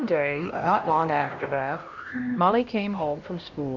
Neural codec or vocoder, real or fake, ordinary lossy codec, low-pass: codec, 16 kHz, 1 kbps, X-Codec, HuBERT features, trained on LibriSpeech; fake; Opus, 64 kbps; 7.2 kHz